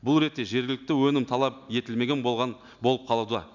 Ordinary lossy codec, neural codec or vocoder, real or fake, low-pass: none; none; real; 7.2 kHz